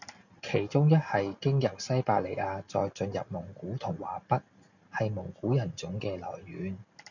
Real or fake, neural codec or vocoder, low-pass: real; none; 7.2 kHz